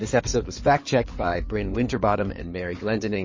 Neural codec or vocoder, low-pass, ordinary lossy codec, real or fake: codec, 16 kHz in and 24 kHz out, 2.2 kbps, FireRedTTS-2 codec; 7.2 kHz; MP3, 32 kbps; fake